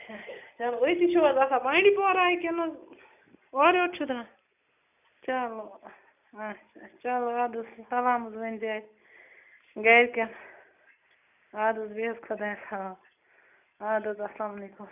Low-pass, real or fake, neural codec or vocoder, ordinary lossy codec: 3.6 kHz; real; none; none